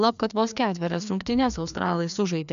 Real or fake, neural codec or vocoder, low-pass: fake; codec, 16 kHz, 2 kbps, FreqCodec, larger model; 7.2 kHz